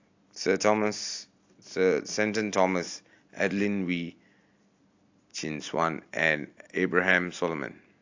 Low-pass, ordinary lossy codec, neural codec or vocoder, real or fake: 7.2 kHz; AAC, 48 kbps; none; real